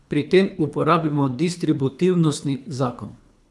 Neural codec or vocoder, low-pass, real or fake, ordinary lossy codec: codec, 24 kHz, 3 kbps, HILCodec; none; fake; none